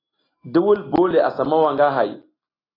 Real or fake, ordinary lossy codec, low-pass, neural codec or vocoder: real; AAC, 24 kbps; 5.4 kHz; none